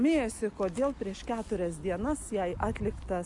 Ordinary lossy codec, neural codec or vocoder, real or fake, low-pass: MP3, 96 kbps; none; real; 10.8 kHz